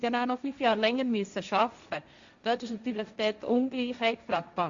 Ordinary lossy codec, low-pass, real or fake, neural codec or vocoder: Opus, 64 kbps; 7.2 kHz; fake; codec, 16 kHz, 1.1 kbps, Voila-Tokenizer